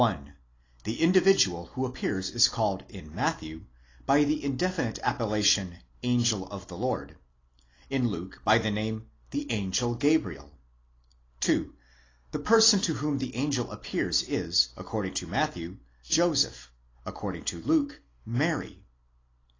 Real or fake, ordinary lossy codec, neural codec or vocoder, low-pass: real; AAC, 32 kbps; none; 7.2 kHz